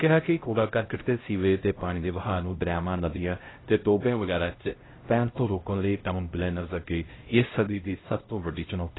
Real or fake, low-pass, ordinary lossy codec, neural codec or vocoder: fake; 7.2 kHz; AAC, 16 kbps; codec, 16 kHz, 0.5 kbps, X-Codec, HuBERT features, trained on LibriSpeech